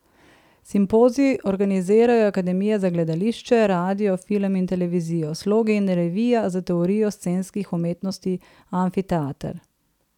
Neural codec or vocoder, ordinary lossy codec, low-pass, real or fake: vocoder, 44.1 kHz, 128 mel bands every 256 samples, BigVGAN v2; none; 19.8 kHz; fake